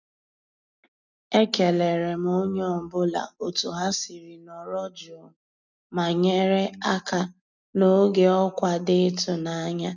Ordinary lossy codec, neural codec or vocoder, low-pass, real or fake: none; none; 7.2 kHz; real